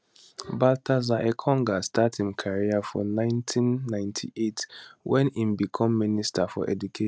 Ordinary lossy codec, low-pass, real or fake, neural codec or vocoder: none; none; real; none